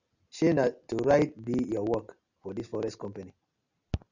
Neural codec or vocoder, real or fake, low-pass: none; real; 7.2 kHz